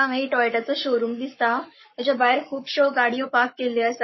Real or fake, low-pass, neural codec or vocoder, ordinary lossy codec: fake; 7.2 kHz; codec, 44.1 kHz, 7.8 kbps, Pupu-Codec; MP3, 24 kbps